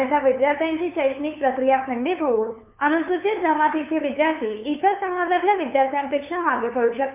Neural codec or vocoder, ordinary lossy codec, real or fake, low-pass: codec, 16 kHz, 2 kbps, FunCodec, trained on LibriTTS, 25 frames a second; MP3, 32 kbps; fake; 3.6 kHz